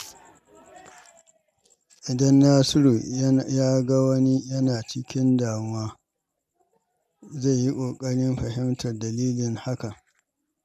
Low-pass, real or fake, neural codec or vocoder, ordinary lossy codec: 14.4 kHz; real; none; none